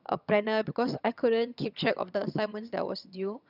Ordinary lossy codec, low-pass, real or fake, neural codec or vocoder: none; 5.4 kHz; fake; vocoder, 22.05 kHz, 80 mel bands, HiFi-GAN